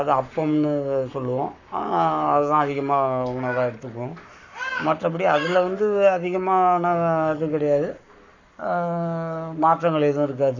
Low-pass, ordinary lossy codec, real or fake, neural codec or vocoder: 7.2 kHz; none; fake; codec, 44.1 kHz, 7.8 kbps, Pupu-Codec